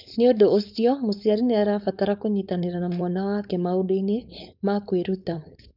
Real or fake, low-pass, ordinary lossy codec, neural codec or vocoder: fake; 5.4 kHz; none; codec, 16 kHz, 4.8 kbps, FACodec